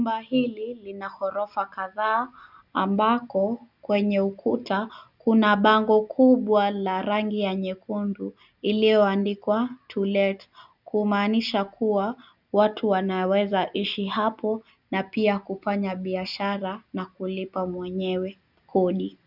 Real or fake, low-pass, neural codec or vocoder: real; 5.4 kHz; none